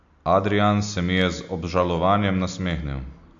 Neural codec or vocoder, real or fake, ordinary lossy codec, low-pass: none; real; AAC, 64 kbps; 7.2 kHz